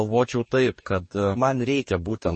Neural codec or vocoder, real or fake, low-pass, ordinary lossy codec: codec, 44.1 kHz, 2.6 kbps, DAC; fake; 10.8 kHz; MP3, 32 kbps